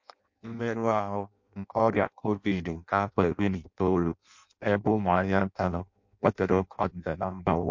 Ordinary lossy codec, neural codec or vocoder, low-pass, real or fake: MP3, 48 kbps; codec, 16 kHz in and 24 kHz out, 0.6 kbps, FireRedTTS-2 codec; 7.2 kHz; fake